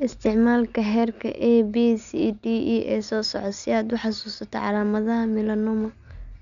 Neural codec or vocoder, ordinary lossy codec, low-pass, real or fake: none; none; 7.2 kHz; real